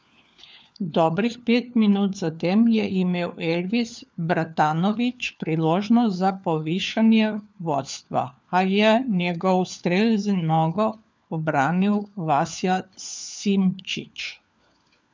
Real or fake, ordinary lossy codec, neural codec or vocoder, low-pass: fake; none; codec, 16 kHz, 4 kbps, FunCodec, trained on LibriTTS, 50 frames a second; none